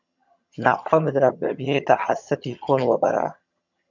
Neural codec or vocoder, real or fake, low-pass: vocoder, 22.05 kHz, 80 mel bands, HiFi-GAN; fake; 7.2 kHz